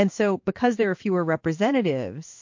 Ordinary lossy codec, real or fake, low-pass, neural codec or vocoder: MP3, 48 kbps; fake; 7.2 kHz; codec, 16 kHz in and 24 kHz out, 1 kbps, XY-Tokenizer